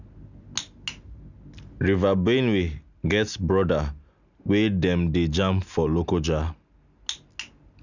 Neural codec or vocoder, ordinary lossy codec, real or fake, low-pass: none; none; real; 7.2 kHz